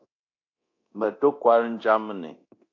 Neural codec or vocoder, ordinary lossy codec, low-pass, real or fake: codec, 24 kHz, 0.9 kbps, DualCodec; AAC, 48 kbps; 7.2 kHz; fake